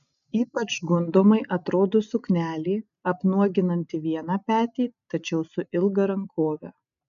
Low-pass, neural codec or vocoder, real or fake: 7.2 kHz; none; real